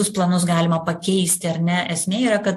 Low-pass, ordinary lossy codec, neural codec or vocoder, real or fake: 14.4 kHz; AAC, 64 kbps; none; real